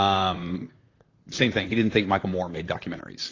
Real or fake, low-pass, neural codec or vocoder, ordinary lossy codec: fake; 7.2 kHz; vocoder, 44.1 kHz, 128 mel bands, Pupu-Vocoder; AAC, 32 kbps